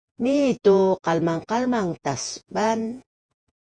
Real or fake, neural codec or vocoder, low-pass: fake; vocoder, 48 kHz, 128 mel bands, Vocos; 9.9 kHz